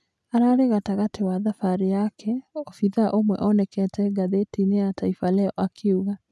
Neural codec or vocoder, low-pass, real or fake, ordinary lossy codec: none; none; real; none